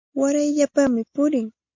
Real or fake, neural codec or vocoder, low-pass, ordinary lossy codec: real; none; 7.2 kHz; MP3, 48 kbps